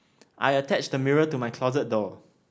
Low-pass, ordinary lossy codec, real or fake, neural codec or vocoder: none; none; real; none